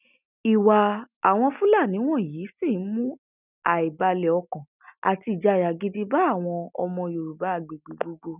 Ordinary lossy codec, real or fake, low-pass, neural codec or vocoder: none; real; 3.6 kHz; none